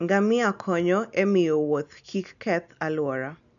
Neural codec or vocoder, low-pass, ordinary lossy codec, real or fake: none; 7.2 kHz; none; real